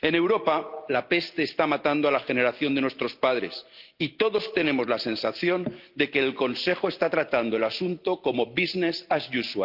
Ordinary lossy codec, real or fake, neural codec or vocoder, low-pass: Opus, 24 kbps; real; none; 5.4 kHz